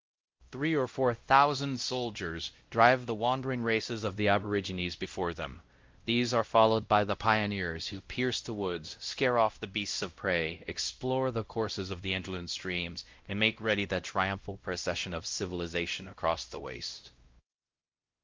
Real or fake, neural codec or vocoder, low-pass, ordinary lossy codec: fake; codec, 16 kHz, 0.5 kbps, X-Codec, WavLM features, trained on Multilingual LibriSpeech; 7.2 kHz; Opus, 32 kbps